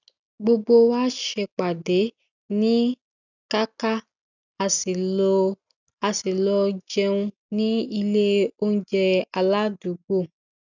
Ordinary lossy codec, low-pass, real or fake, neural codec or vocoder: none; 7.2 kHz; real; none